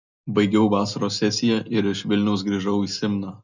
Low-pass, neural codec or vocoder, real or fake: 7.2 kHz; none; real